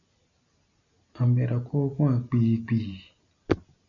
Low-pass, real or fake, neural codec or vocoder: 7.2 kHz; real; none